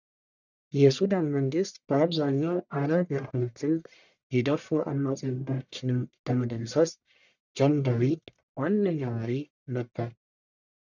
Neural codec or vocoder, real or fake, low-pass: codec, 44.1 kHz, 1.7 kbps, Pupu-Codec; fake; 7.2 kHz